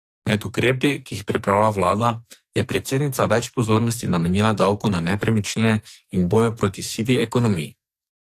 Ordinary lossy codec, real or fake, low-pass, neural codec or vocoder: AAC, 64 kbps; fake; 14.4 kHz; codec, 44.1 kHz, 2.6 kbps, SNAC